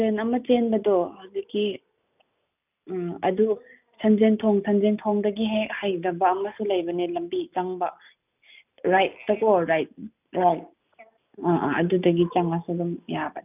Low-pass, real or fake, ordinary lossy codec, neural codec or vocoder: 3.6 kHz; real; none; none